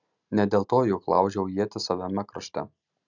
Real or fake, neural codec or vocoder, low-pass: real; none; 7.2 kHz